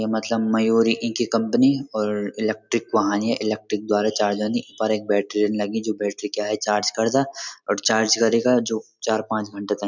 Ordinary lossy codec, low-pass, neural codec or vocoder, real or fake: none; 7.2 kHz; none; real